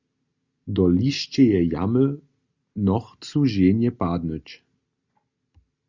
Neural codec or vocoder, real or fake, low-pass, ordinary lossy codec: none; real; 7.2 kHz; Opus, 64 kbps